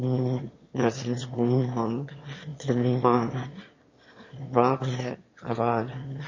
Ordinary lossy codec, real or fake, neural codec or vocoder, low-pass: MP3, 32 kbps; fake; autoencoder, 22.05 kHz, a latent of 192 numbers a frame, VITS, trained on one speaker; 7.2 kHz